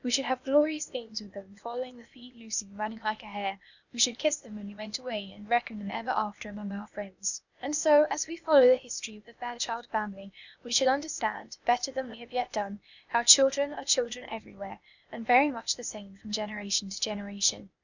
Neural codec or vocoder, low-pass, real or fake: codec, 16 kHz, 0.8 kbps, ZipCodec; 7.2 kHz; fake